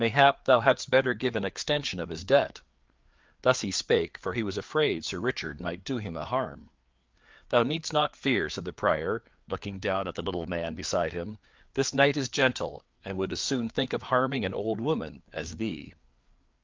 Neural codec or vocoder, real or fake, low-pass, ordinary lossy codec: codec, 16 kHz, 4 kbps, FreqCodec, larger model; fake; 7.2 kHz; Opus, 32 kbps